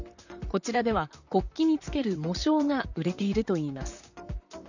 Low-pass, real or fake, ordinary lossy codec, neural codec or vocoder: 7.2 kHz; fake; none; vocoder, 44.1 kHz, 128 mel bands, Pupu-Vocoder